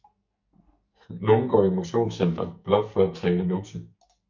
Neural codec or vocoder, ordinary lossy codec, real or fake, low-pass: codec, 44.1 kHz, 2.6 kbps, SNAC; MP3, 48 kbps; fake; 7.2 kHz